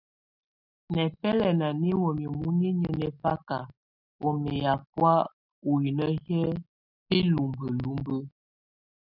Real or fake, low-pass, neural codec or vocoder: real; 5.4 kHz; none